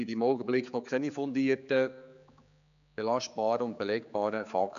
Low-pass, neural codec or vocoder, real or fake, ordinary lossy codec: 7.2 kHz; codec, 16 kHz, 4 kbps, X-Codec, HuBERT features, trained on general audio; fake; none